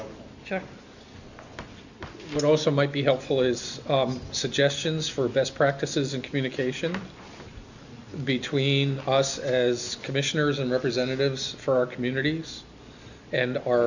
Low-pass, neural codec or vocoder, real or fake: 7.2 kHz; none; real